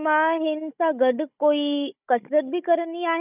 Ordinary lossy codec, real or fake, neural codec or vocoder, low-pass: none; fake; codec, 16 kHz, 16 kbps, FunCodec, trained on Chinese and English, 50 frames a second; 3.6 kHz